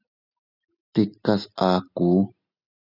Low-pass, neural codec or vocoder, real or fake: 5.4 kHz; none; real